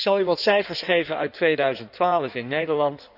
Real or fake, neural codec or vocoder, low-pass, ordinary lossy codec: fake; codec, 16 kHz in and 24 kHz out, 1.1 kbps, FireRedTTS-2 codec; 5.4 kHz; none